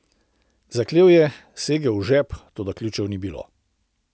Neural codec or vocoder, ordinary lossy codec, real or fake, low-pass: none; none; real; none